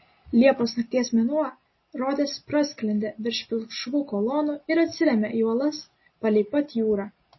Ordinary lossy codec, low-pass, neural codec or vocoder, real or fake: MP3, 24 kbps; 7.2 kHz; none; real